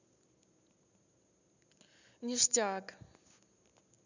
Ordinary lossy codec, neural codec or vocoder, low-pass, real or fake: none; none; 7.2 kHz; real